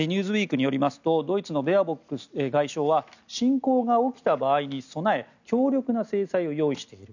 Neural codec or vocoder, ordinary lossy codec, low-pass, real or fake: none; none; 7.2 kHz; real